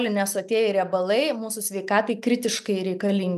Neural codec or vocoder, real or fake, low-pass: none; real; 14.4 kHz